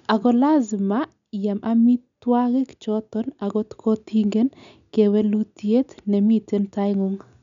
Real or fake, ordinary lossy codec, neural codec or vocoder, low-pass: real; none; none; 7.2 kHz